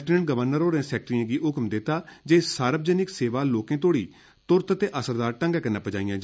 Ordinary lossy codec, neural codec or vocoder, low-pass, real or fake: none; none; none; real